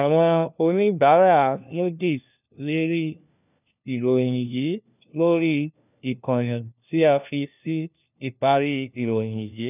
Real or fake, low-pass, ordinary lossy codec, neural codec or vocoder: fake; 3.6 kHz; none; codec, 16 kHz, 1 kbps, FunCodec, trained on LibriTTS, 50 frames a second